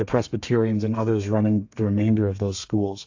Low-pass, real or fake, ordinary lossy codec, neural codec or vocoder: 7.2 kHz; fake; AAC, 48 kbps; codec, 32 kHz, 1.9 kbps, SNAC